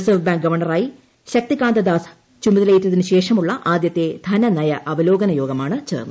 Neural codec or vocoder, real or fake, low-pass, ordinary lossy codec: none; real; none; none